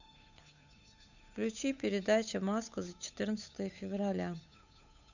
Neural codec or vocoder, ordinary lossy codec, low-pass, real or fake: codec, 16 kHz, 8 kbps, FunCodec, trained on Chinese and English, 25 frames a second; none; 7.2 kHz; fake